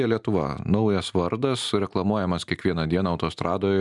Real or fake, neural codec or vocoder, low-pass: real; none; 10.8 kHz